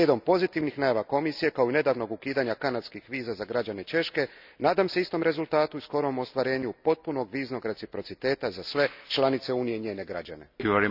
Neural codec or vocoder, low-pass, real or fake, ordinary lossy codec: none; 5.4 kHz; real; none